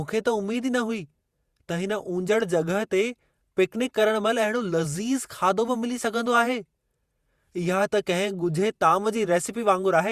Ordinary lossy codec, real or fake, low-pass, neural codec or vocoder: Opus, 64 kbps; fake; 14.4 kHz; vocoder, 48 kHz, 128 mel bands, Vocos